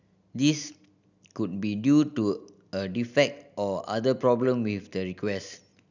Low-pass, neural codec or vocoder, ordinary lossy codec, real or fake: 7.2 kHz; none; none; real